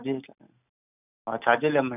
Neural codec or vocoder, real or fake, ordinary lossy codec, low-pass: none; real; none; 3.6 kHz